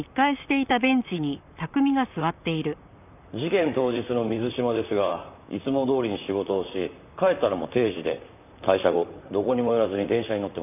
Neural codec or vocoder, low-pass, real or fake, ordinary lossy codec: vocoder, 44.1 kHz, 128 mel bands, Pupu-Vocoder; 3.6 kHz; fake; none